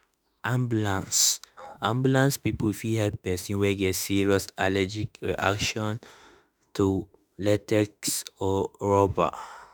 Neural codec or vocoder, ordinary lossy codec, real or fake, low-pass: autoencoder, 48 kHz, 32 numbers a frame, DAC-VAE, trained on Japanese speech; none; fake; none